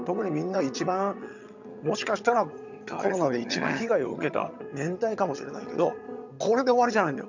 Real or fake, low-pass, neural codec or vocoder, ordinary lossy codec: fake; 7.2 kHz; vocoder, 22.05 kHz, 80 mel bands, HiFi-GAN; none